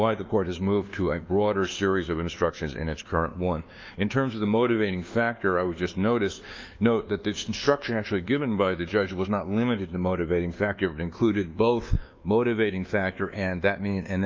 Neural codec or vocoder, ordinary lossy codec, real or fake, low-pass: codec, 24 kHz, 1.2 kbps, DualCodec; Opus, 24 kbps; fake; 7.2 kHz